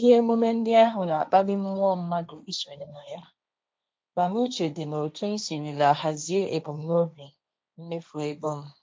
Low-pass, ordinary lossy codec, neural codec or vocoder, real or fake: none; none; codec, 16 kHz, 1.1 kbps, Voila-Tokenizer; fake